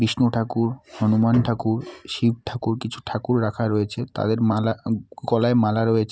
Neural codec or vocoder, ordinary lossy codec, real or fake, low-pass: none; none; real; none